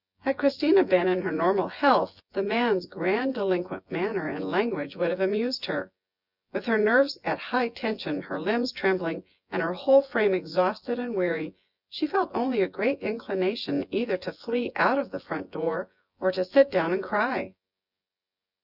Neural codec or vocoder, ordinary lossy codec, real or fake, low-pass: vocoder, 24 kHz, 100 mel bands, Vocos; Opus, 64 kbps; fake; 5.4 kHz